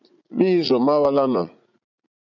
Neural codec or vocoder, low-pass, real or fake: vocoder, 44.1 kHz, 80 mel bands, Vocos; 7.2 kHz; fake